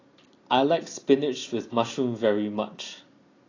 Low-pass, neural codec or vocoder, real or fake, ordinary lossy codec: 7.2 kHz; none; real; AAC, 32 kbps